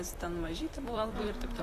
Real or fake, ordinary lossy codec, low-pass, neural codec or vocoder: fake; AAC, 48 kbps; 14.4 kHz; vocoder, 44.1 kHz, 128 mel bands, Pupu-Vocoder